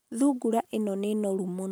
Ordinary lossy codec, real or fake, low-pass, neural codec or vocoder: none; real; none; none